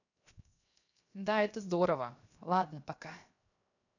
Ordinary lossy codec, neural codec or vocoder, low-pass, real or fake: none; codec, 16 kHz, 0.7 kbps, FocalCodec; 7.2 kHz; fake